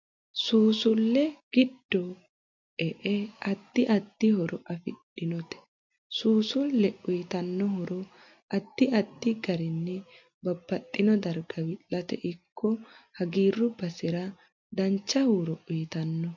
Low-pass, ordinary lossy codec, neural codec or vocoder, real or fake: 7.2 kHz; MP3, 48 kbps; none; real